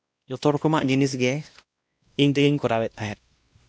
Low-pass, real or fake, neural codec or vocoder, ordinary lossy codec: none; fake; codec, 16 kHz, 1 kbps, X-Codec, WavLM features, trained on Multilingual LibriSpeech; none